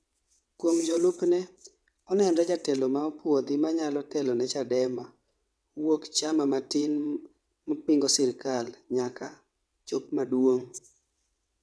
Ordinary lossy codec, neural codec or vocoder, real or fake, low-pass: none; vocoder, 22.05 kHz, 80 mel bands, WaveNeXt; fake; none